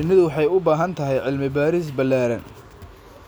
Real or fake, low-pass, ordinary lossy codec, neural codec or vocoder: real; none; none; none